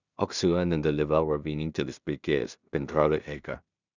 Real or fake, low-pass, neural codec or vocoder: fake; 7.2 kHz; codec, 16 kHz in and 24 kHz out, 0.4 kbps, LongCat-Audio-Codec, two codebook decoder